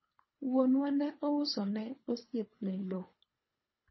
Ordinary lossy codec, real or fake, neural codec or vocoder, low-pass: MP3, 24 kbps; fake; codec, 24 kHz, 3 kbps, HILCodec; 7.2 kHz